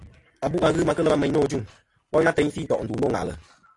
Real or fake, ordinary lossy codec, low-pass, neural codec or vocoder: real; AAC, 48 kbps; 10.8 kHz; none